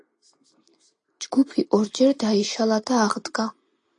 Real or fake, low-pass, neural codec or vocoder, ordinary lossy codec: real; 9.9 kHz; none; AAC, 48 kbps